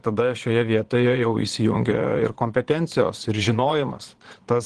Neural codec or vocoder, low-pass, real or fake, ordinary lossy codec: vocoder, 22.05 kHz, 80 mel bands, Vocos; 9.9 kHz; fake; Opus, 16 kbps